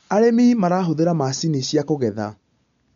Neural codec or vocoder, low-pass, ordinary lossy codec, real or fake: none; 7.2 kHz; MP3, 64 kbps; real